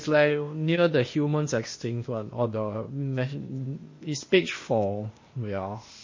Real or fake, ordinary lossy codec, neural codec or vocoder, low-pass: fake; MP3, 32 kbps; codec, 16 kHz, 0.8 kbps, ZipCodec; 7.2 kHz